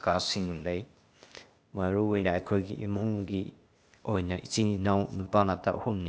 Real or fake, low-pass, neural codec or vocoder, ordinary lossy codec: fake; none; codec, 16 kHz, 0.8 kbps, ZipCodec; none